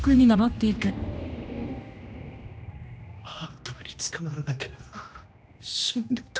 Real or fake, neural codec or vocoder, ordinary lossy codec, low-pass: fake; codec, 16 kHz, 1 kbps, X-Codec, HuBERT features, trained on general audio; none; none